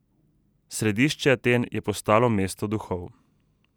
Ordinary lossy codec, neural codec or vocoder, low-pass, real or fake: none; none; none; real